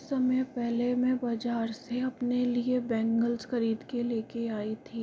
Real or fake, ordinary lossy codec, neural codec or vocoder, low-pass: real; none; none; none